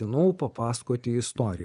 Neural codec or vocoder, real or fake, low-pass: vocoder, 24 kHz, 100 mel bands, Vocos; fake; 10.8 kHz